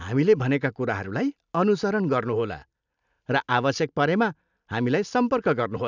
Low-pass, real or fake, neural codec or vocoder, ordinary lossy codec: 7.2 kHz; real; none; none